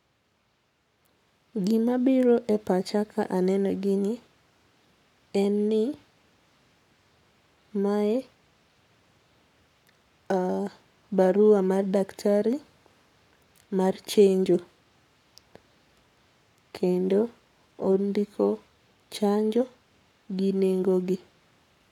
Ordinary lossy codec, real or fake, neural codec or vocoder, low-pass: none; fake; codec, 44.1 kHz, 7.8 kbps, Pupu-Codec; 19.8 kHz